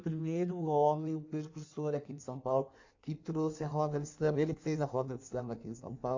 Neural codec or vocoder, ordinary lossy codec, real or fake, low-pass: codec, 16 kHz in and 24 kHz out, 1.1 kbps, FireRedTTS-2 codec; AAC, 48 kbps; fake; 7.2 kHz